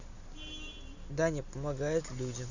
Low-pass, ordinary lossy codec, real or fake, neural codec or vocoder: 7.2 kHz; none; real; none